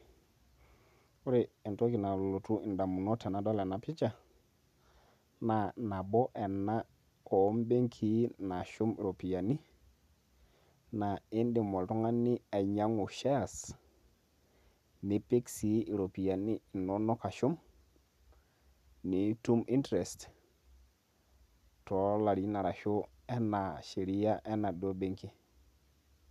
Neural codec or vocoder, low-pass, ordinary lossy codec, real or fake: none; 14.4 kHz; none; real